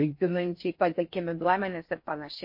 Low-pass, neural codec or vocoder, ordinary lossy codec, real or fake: 5.4 kHz; codec, 16 kHz in and 24 kHz out, 0.6 kbps, FocalCodec, streaming, 4096 codes; MP3, 32 kbps; fake